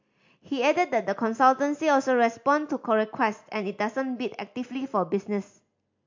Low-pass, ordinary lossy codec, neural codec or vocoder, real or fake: 7.2 kHz; MP3, 48 kbps; none; real